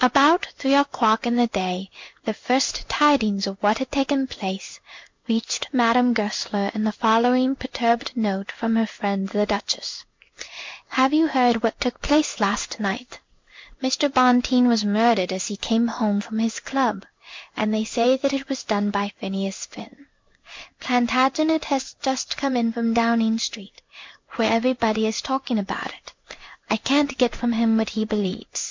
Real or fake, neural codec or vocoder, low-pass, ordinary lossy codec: fake; codec, 16 kHz in and 24 kHz out, 1 kbps, XY-Tokenizer; 7.2 kHz; MP3, 48 kbps